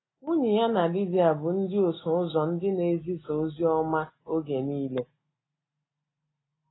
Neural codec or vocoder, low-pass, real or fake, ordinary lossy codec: none; 7.2 kHz; real; AAC, 16 kbps